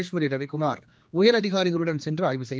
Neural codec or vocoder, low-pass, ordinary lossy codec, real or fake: codec, 16 kHz, 2 kbps, X-Codec, HuBERT features, trained on general audio; none; none; fake